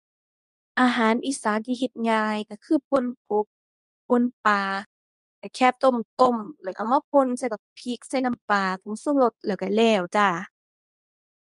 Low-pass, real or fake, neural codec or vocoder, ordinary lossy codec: 10.8 kHz; fake; codec, 24 kHz, 0.9 kbps, WavTokenizer, medium speech release version 2; none